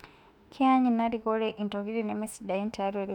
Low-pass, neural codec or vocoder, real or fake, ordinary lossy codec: 19.8 kHz; autoencoder, 48 kHz, 32 numbers a frame, DAC-VAE, trained on Japanese speech; fake; none